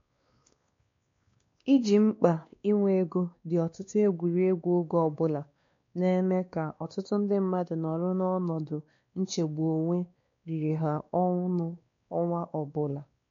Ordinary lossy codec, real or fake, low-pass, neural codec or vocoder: MP3, 48 kbps; fake; 7.2 kHz; codec, 16 kHz, 2 kbps, X-Codec, WavLM features, trained on Multilingual LibriSpeech